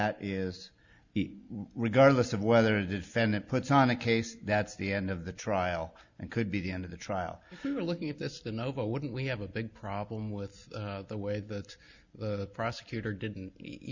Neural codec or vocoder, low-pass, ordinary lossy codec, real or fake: none; 7.2 kHz; Opus, 64 kbps; real